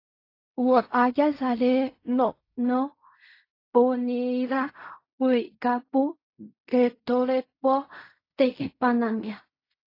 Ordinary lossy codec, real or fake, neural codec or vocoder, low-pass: AAC, 32 kbps; fake; codec, 16 kHz in and 24 kHz out, 0.4 kbps, LongCat-Audio-Codec, fine tuned four codebook decoder; 5.4 kHz